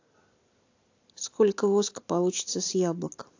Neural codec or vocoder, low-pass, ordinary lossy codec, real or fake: none; 7.2 kHz; AAC, 48 kbps; real